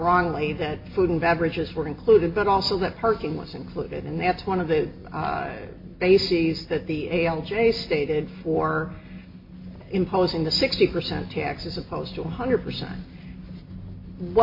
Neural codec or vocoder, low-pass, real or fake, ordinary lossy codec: none; 5.4 kHz; real; MP3, 32 kbps